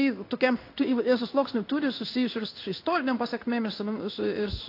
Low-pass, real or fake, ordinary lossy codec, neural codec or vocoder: 5.4 kHz; fake; AAC, 32 kbps; codec, 16 kHz in and 24 kHz out, 1 kbps, XY-Tokenizer